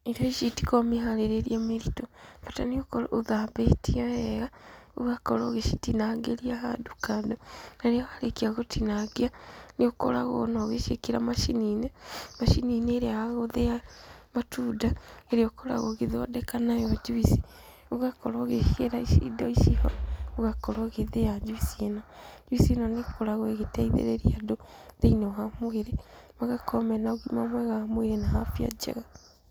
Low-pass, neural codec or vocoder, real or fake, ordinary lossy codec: none; none; real; none